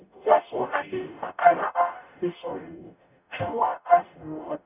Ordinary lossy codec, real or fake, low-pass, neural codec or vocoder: none; fake; 3.6 kHz; codec, 44.1 kHz, 0.9 kbps, DAC